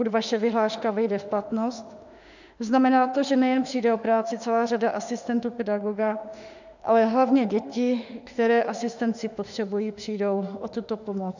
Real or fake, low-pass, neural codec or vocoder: fake; 7.2 kHz; autoencoder, 48 kHz, 32 numbers a frame, DAC-VAE, trained on Japanese speech